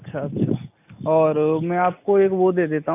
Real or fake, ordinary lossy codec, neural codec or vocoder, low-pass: real; AAC, 32 kbps; none; 3.6 kHz